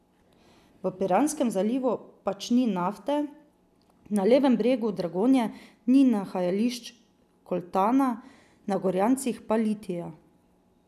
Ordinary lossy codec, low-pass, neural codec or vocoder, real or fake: none; 14.4 kHz; none; real